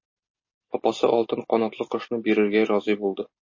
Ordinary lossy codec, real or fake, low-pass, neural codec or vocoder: MP3, 32 kbps; real; 7.2 kHz; none